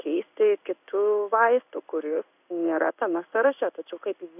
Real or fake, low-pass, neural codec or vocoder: fake; 3.6 kHz; codec, 16 kHz in and 24 kHz out, 1 kbps, XY-Tokenizer